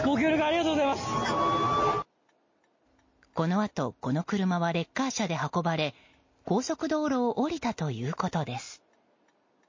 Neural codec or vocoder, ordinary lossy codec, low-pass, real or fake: none; MP3, 32 kbps; 7.2 kHz; real